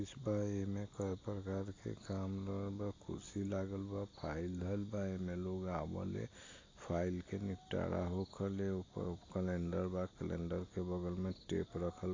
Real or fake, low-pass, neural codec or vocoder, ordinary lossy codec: real; 7.2 kHz; none; AAC, 32 kbps